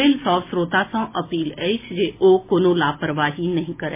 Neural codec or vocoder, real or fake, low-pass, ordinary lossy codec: none; real; 3.6 kHz; MP3, 24 kbps